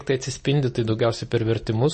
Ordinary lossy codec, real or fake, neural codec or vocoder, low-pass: MP3, 32 kbps; real; none; 10.8 kHz